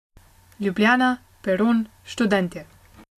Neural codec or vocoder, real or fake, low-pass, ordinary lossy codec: none; real; 14.4 kHz; AAC, 64 kbps